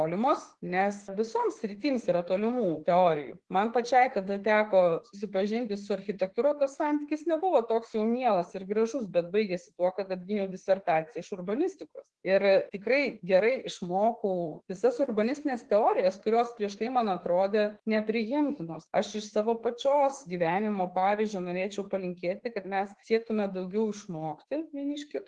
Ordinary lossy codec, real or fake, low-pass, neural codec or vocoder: Opus, 16 kbps; fake; 10.8 kHz; autoencoder, 48 kHz, 32 numbers a frame, DAC-VAE, trained on Japanese speech